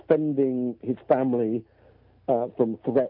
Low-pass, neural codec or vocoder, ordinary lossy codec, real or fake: 5.4 kHz; none; AAC, 48 kbps; real